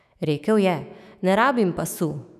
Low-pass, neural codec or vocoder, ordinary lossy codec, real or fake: 14.4 kHz; autoencoder, 48 kHz, 128 numbers a frame, DAC-VAE, trained on Japanese speech; none; fake